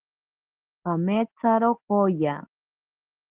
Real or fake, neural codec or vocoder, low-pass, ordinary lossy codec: real; none; 3.6 kHz; Opus, 16 kbps